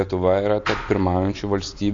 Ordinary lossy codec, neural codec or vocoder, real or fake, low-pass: AAC, 48 kbps; none; real; 7.2 kHz